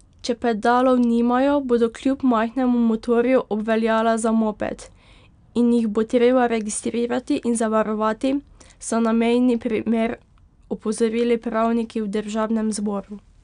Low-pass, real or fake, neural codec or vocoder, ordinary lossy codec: 9.9 kHz; real; none; none